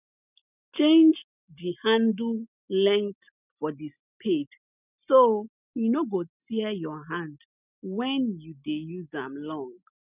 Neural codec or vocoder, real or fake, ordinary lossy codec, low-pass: none; real; none; 3.6 kHz